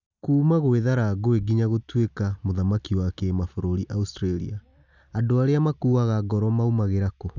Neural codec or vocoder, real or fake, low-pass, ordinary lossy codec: none; real; 7.2 kHz; none